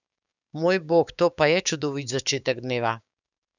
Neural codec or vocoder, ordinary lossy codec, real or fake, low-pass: codec, 16 kHz, 6 kbps, DAC; none; fake; 7.2 kHz